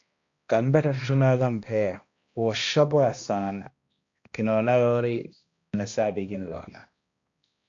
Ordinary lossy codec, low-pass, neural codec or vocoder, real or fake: AAC, 48 kbps; 7.2 kHz; codec, 16 kHz, 1 kbps, X-Codec, HuBERT features, trained on balanced general audio; fake